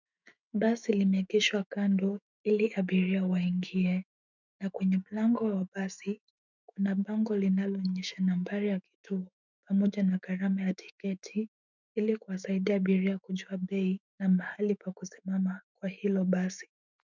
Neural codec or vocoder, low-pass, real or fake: autoencoder, 48 kHz, 128 numbers a frame, DAC-VAE, trained on Japanese speech; 7.2 kHz; fake